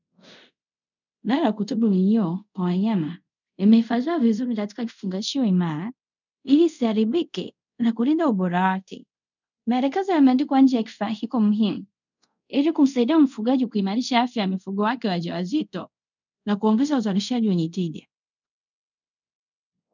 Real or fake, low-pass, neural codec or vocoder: fake; 7.2 kHz; codec, 24 kHz, 0.5 kbps, DualCodec